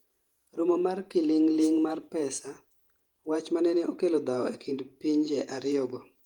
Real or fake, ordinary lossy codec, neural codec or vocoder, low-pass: fake; Opus, 32 kbps; vocoder, 48 kHz, 128 mel bands, Vocos; 19.8 kHz